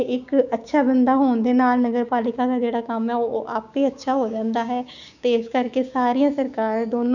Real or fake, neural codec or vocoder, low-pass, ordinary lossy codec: fake; codec, 16 kHz, 6 kbps, DAC; 7.2 kHz; none